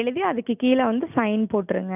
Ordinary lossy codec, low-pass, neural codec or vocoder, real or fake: none; 3.6 kHz; none; real